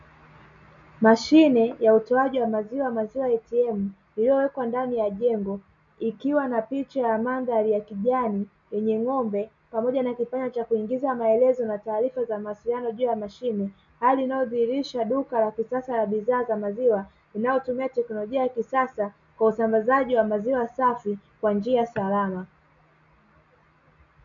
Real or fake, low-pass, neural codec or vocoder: real; 7.2 kHz; none